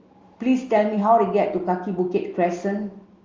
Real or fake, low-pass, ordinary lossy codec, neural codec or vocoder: real; 7.2 kHz; Opus, 32 kbps; none